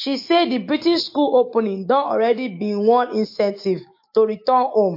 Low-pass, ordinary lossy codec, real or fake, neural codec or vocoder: 5.4 kHz; MP3, 32 kbps; fake; autoencoder, 48 kHz, 128 numbers a frame, DAC-VAE, trained on Japanese speech